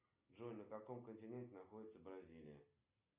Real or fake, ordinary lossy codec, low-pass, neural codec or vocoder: real; Opus, 64 kbps; 3.6 kHz; none